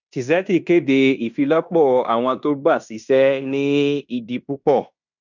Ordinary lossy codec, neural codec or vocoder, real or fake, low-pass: none; codec, 16 kHz in and 24 kHz out, 0.9 kbps, LongCat-Audio-Codec, fine tuned four codebook decoder; fake; 7.2 kHz